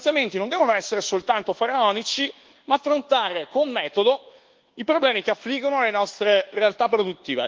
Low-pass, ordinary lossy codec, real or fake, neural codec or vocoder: 7.2 kHz; Opus, 16 kbps; fake; codec, 24 kHz, 1.2 kbps, DualCodec